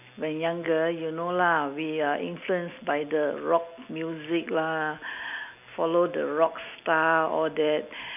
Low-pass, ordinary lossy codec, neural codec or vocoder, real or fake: 3.6 kHz; none; none; real